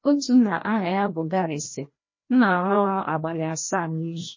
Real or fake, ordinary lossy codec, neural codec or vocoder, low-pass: fake; MP3, 32 kbps; codec, 16 kHz, 1 kbps, FreqCodec, larger model; 7.2 kHz